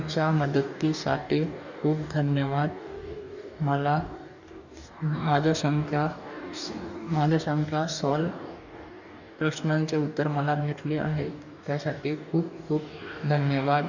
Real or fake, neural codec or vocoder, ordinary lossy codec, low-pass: fake; codec, 44.1 kHz, 2.6 kbps, DAC; none; 7.2 kHz